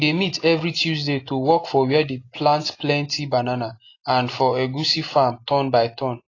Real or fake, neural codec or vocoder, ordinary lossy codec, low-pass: real; none; AAC, 32 kbps; 7.2 kHz